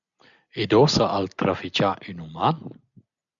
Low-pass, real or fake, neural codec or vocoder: 7.2 kHz; real; none